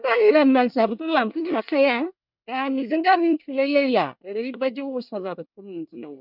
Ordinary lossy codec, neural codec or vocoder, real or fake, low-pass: none; codec, 24 kHz, 1 kbps, SNAC; fake; 5.4 kHz